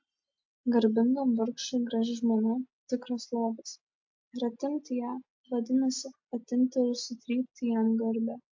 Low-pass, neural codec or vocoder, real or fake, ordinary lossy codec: 7.2 kHz; none; real; MP3, 48 kbps